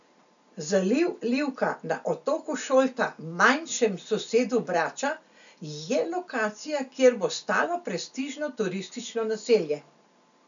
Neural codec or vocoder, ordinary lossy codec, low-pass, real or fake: none; none; 7.2 kHz; real